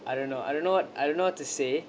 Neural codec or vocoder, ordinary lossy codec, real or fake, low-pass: none; none; real; none